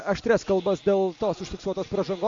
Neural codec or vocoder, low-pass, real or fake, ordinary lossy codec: none; 7.2 kHz; real; MP3, 48 kbps